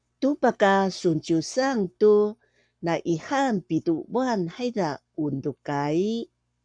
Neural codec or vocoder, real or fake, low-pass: codec, 44.1 kHz, 7.8 kbps, Pupu-Codec; fake; 9.9 kHz